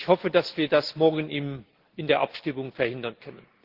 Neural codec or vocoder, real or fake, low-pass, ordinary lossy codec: none; real; 5.4 kHz; Opus, 16 kbps